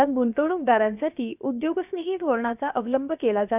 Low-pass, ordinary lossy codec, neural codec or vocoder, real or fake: 3.6 kHz; none; codec, 16 kHz, about 1 kbps, DyCAST, with the encoder's durations; fake